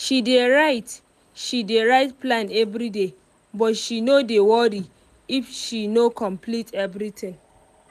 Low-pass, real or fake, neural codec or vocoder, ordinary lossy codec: 14.4 kHz; real; none; none